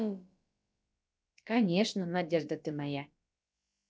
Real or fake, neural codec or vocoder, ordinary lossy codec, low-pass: fake; codec, 16 kHz, about 1 kbps, DyCAST, with the encoder's durations; none; none